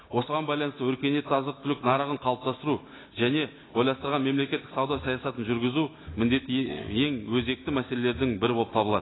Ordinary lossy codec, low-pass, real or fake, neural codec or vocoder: AAC, 16 kbps; 7.2 kHz; real; none